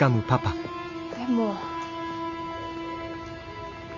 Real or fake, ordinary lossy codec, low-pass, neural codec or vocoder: real; none; 7.2 kHz; none